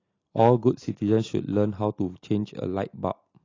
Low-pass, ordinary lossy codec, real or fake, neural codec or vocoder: 7.2 kHz; AAC, 32 kbps; real; none